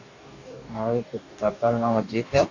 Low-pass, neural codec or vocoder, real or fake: 7.2 kHz; codec, 44.1 kHz, 2.6 kbps, DAC; fake